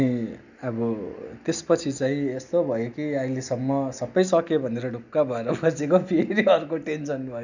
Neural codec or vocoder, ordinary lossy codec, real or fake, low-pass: none; none; real; 7.2 kHz